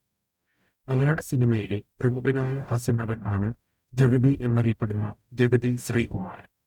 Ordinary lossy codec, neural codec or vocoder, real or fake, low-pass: none; codec, 44.1 kHz, 0.9 kbps, DAC; fake; 19.8 kHz